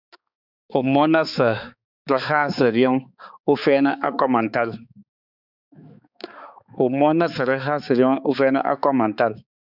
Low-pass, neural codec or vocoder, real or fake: 5.4 kHz; codec, 16 kHz, 4 kbps, X-Codec, HuBERT features, trained on balanced general audio; fake